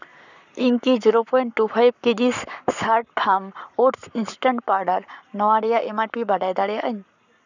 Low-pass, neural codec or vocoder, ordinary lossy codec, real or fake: 7.2 kHz; codec, 44.1 kHz, 7.8 kbps, Pupu-Codec; none; fake